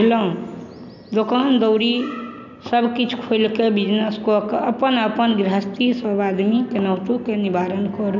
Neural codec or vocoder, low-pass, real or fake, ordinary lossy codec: none; 7.2 kHz; real; none